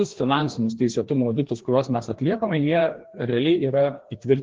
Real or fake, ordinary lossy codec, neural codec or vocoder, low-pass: fake; Opus, 16 kbps; codec, 16 kHz, 2 kbps, FreqCodec, larger model; 7.2 kHz